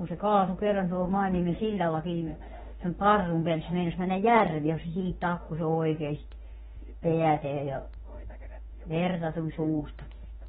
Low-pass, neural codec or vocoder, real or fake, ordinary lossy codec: 19.8 kHz; autoencoder, 48 kHz, 32 numbers a frame, DAC-VAE, trained on Japanese speech; fake; AAC, 16 kbps